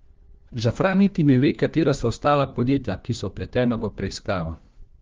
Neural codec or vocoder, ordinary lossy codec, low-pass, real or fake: codec, 16 kHz, 1 kbps, FunCodec, trained on LibriTTS, 50 frames a second; Opus, 32 kbps; 7.2 kHz; fake